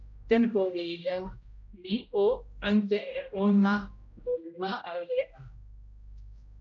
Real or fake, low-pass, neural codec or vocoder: fake; 7.2 kHz; codec, 16 kHz, 0.5 kbps, X-Codec, HuBERT features, trained on general audio